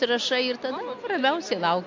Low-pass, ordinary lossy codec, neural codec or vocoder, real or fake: 7.2 kHz; MP3, 48 kbps; none; real